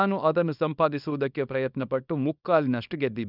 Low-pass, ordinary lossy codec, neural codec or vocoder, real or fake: 5.4 kHz; none; codec, 24 kHz, 0.9 kbps, WavTokenizer, small release; fake